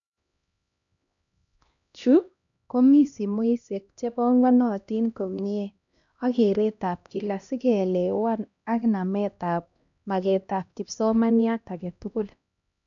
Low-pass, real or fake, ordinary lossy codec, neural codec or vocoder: 7.2 kHz; fake; none; codec, 16 kHz, 1 kbps, X-Codec, HuBERT features, trained on LibriSpeech